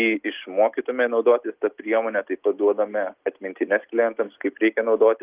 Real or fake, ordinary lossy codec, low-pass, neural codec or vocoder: real; Opus, 32 kbps; 3.6 kHz; none